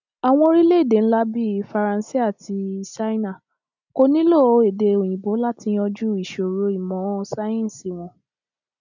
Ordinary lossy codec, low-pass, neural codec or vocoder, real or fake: none; 7.2 kHz; none; real